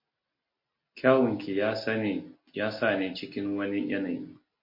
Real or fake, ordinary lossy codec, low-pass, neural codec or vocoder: real; MP3, 32 kbps; 5.4 kHz; none